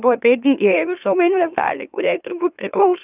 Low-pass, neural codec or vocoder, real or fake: 3.6 kHz; autoencoder, 44.1 kHz, a latent of 192 numbers a frame, MeloTTS; fake